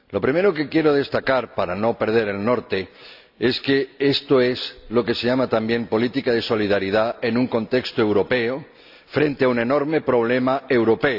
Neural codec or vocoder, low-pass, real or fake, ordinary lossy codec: none; 5.4 kHz; real; AAC, 48 kbps